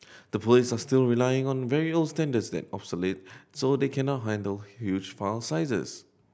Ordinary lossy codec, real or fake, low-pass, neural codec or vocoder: none; real; none; none